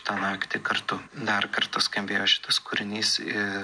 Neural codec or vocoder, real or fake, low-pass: none; real; 9.9 kHz